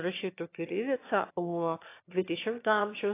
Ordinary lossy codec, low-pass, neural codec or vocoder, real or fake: AAC, 24 kbps; 3.6 kHz; autoencoder, 22.05 kHz, a latent of 192 numbers a frame, VITS, trained on one speaker; fake